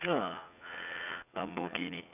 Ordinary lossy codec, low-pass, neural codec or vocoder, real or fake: none; 3.6 kHz; vocoder, 44.1 kHz, 80 mel bands, Vocos; fake